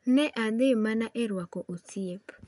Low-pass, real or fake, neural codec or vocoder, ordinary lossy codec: 10.8 kHz; real; none; none